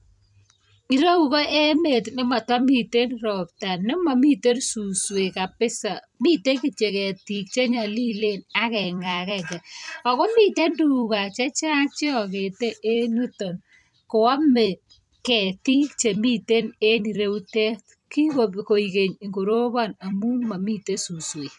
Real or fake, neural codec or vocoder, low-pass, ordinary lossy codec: fake; vocoder, 44.1 kHz, 128 mel bands every 256 samples, BigVGAN v2; 10.8 kHz; none